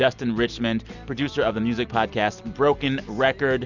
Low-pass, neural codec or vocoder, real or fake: 7.2 kHz; none; real